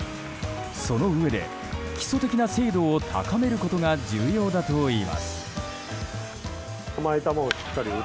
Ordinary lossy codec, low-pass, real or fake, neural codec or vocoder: none; none; real; none